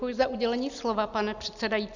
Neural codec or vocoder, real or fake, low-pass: none; real; 7.2 kHz